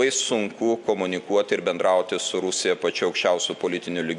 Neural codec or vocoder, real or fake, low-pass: none; real; 10.8 kHz